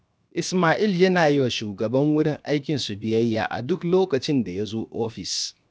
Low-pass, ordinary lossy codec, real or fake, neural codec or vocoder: none; none; fake; codec, 16 kHz, 0.7 kbps, FocalCodec